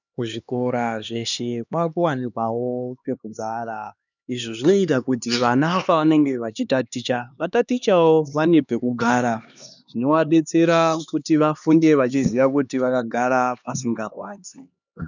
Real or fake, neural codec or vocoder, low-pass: fake; codec, 16 kHz, 2 kbps, X-Codec, HuBERT features, trained on LibriSpeech; 7.2 kHz